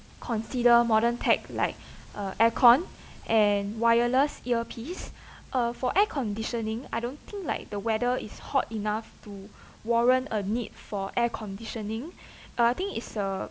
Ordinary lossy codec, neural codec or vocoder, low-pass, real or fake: none; none; none; real